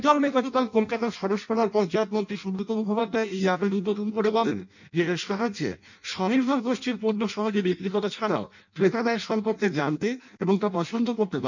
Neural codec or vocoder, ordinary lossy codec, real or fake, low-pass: codec, 16 kHz in and 24 kHz out, 0.6 kbps, FireRedTTS-2 codec; none; fake; 7.2 kHz